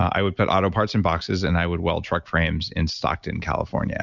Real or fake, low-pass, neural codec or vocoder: real; 7.2 kHz; none